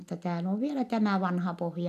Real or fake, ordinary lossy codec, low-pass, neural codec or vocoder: real; none; 14.4 kHz; none